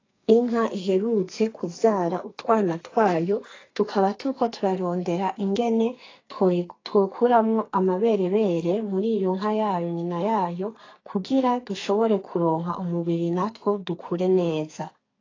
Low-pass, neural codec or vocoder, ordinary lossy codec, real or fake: 7.2 kHz; codec, 44.1 kHz, 2.6 kbps, SNAC; AAC, 32 kbps; fake